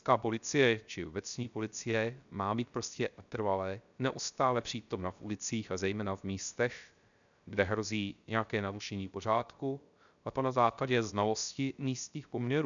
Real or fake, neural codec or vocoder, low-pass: fake; codec, 16 kHz, 0.3 kbps, FocalCodec; 7.2 kHz